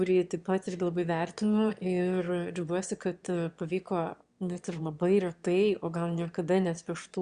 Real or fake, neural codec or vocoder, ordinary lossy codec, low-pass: fake; autoencoder, 22.05 kHz, a latent of 192 numbers a frame, VITS, trained on one speaker; Opus, 64 kbps; 9.9 kHz